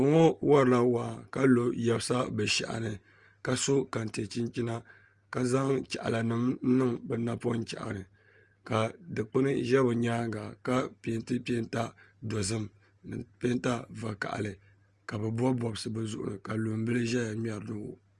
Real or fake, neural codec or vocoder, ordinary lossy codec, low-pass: real; none; Opus, 32 kbps; 10.8 kHz